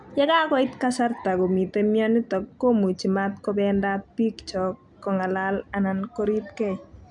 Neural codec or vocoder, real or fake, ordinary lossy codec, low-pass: none; real; none; 10.8 kHz